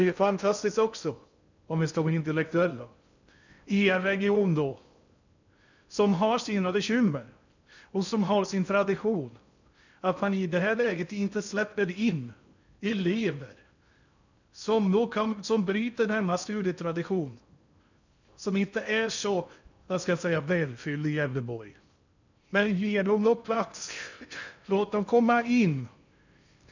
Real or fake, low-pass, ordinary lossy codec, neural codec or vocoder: fake; 7.2 kHz; none; codec, 16 kHz in and 24 kHz out, 0.6 kbps, FocalCodec, streaming, 2048 codes